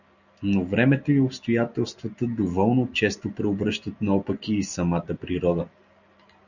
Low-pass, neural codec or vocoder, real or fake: 7.2 kHz; none; real